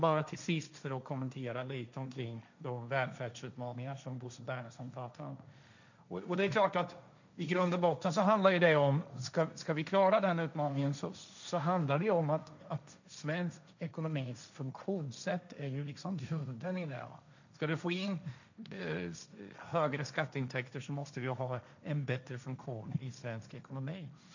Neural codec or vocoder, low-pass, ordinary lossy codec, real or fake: codec, 16 kHz, 1.1 kbps, Voila-Tokenizer; 7.2 kHz; none; fake